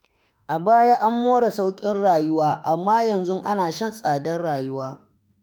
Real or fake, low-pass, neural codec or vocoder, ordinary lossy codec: fake; none; autoencoder, 48 kHz, 32 numbers a frame, DAC-VAE, trained on Japanese speech; none